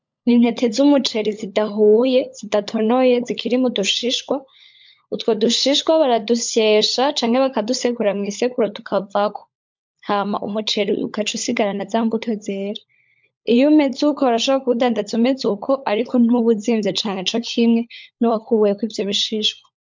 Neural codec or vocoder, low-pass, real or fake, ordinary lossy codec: codec, 16 kHz, 16 kbps, FunCodec, trained on LibriTTS, 50 frames a second; 7.2 kHz; fake; MP3, 64 kbps